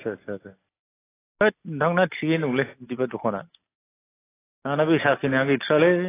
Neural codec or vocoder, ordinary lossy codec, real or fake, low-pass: none; AAC, 16 kbps; real; 3.6 kHz